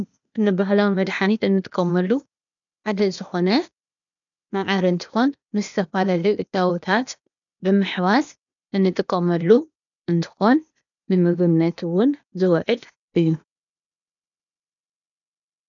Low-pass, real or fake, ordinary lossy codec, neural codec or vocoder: 7.2 kHz; fake; MP3, 96 kbps; codec, 16 kHz, 0.8 kbps, ZipCodec